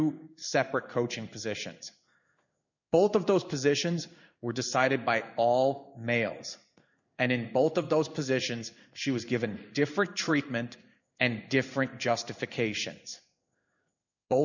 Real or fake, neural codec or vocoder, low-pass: fake; codec, 16 kHz in and 24 kHz out, 1 kbps, XY-Tokenizer; 7.2 kHz